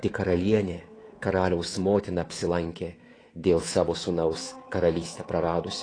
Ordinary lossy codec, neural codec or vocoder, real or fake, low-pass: AAC, 32 kbps; codec, 24 kHz, 3.1 kbps, DualCodec; fake; 9.9 kHz